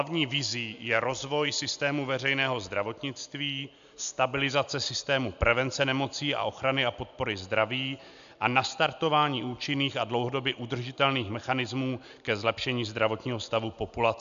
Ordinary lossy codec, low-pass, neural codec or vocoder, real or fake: AAC, 96 kbps; 7.2 kHz; none; real